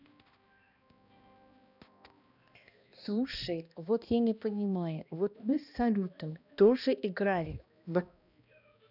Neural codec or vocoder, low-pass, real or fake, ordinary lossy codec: codec, 16 kHz, 1 kbps, X-Codec, HuBERT features, trained on balanced general audio; 5.4 kHz; fake; none